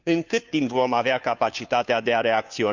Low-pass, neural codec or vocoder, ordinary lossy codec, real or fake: 7.2 kHz; codec, 16 kHz, 2 kbps, FunCodec, trained on Chinese and English, 25 frames a second; Opus, 64 kbps; fake